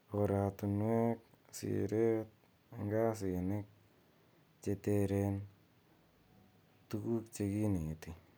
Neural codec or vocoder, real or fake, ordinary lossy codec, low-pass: none; real; none; none